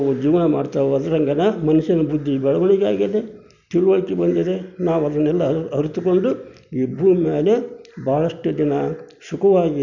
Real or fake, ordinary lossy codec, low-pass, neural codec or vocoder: real; none; 7.2 kHz; none